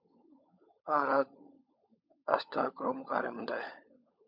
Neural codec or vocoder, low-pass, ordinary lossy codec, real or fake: codec, 16 kHz, 16 kbps, FunCodec, trained on LibriTTS, 50 frames a second; 5.4 kHz; MP3, 48 kbps; fake